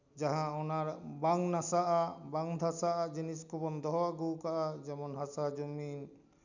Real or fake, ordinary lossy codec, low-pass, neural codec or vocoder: real; none; 7.2 kHz; none